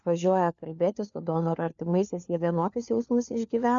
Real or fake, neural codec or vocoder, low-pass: fake; codec, 16 kHz, 4 kbps, FunCodec, trained on LibriTTS, 50 frames a second; 7.2 kHz